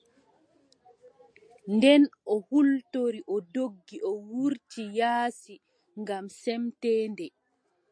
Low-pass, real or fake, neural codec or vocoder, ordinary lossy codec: 9.9 kHz; real; none; MP3, 48 kbps